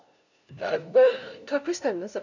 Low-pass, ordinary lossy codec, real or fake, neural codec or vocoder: 7.2 kHz; none; fake; codec, 16 kHz, 0.5 kbps, FunCodec, trained on LibriTTS, 25 frames a second